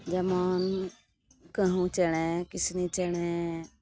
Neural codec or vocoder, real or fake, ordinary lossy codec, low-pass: none; real; none; none